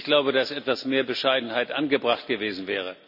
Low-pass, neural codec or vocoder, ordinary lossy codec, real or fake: 5.4 kHz; none; none; real